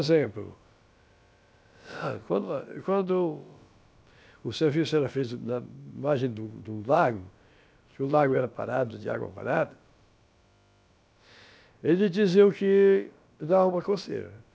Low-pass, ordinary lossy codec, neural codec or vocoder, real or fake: none; none; codec, 16 kHz, about 1 kbps, DyCAST, with the encoder's durations; fake